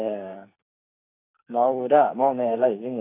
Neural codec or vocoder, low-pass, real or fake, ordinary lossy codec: codec, 44.1 kHz, 2.6 kbps, SNAC; 3.6 kHz; fake; AAC, 24 kbps